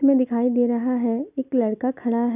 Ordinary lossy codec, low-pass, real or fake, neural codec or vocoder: none; 3.6 kHz; real; none